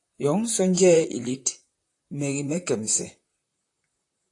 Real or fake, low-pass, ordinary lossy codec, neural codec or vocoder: fake; 10.8 kHz; AAC, 48 kbps; vocoder, 44.1 kHz, 128 mel bands, Pupu-Vocoder